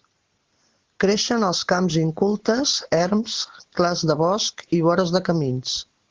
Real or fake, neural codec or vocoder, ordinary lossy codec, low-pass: real; none; Opus, 16 kbps; 7.2 kHz